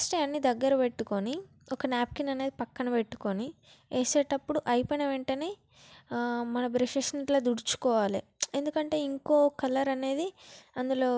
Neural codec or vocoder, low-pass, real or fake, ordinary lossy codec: none; none; real; none